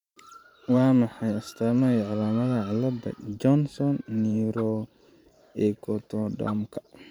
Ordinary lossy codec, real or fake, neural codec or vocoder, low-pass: none; fake; vocoder, 44.1 kHz, 128 mel bands every 512 samples, BigVGAN v2; 19.8 kHz